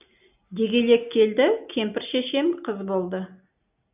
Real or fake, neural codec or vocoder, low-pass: real; none; 3.6 kHz